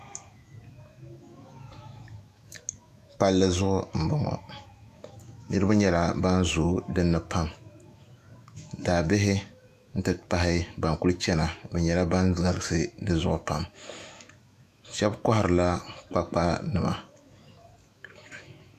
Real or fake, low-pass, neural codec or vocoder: fake; 14.4 kHz; autoencoder, 48 kHz, 128 numbers a frame, DAC-VAE, trained on Japanese speech